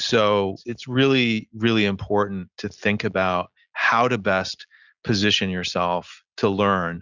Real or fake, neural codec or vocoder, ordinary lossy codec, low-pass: real; none; Opus, 64 kbps; 7.2 kHz